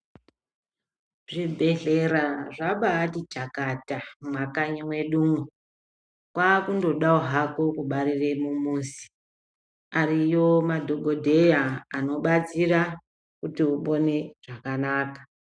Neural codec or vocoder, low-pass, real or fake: none; 9.9 kHz; real